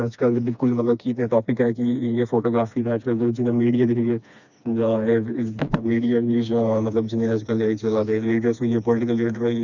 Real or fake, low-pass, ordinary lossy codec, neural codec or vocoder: fake; 7.2 kHz; none; codec, 16 kHz, 2 kbps, FreqCodec, smaller model